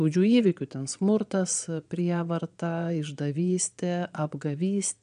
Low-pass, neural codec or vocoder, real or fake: 9.9 kHz; vocoder, 22.05 kHz, 80 mel bands, Vocos; fake